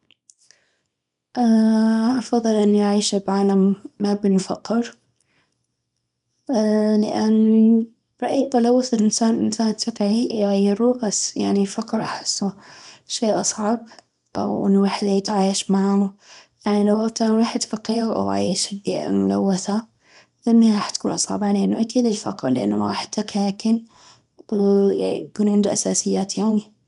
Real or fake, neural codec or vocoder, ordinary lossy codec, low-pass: fake; codec, 24 kHz, 0.9 kbps, WavTokenizer, small release; none; 10.8 kHz